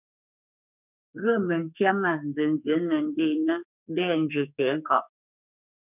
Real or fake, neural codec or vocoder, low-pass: fake; codec, 44.1 kHz, 2.6 kbps, SNAC; 3.6 kHz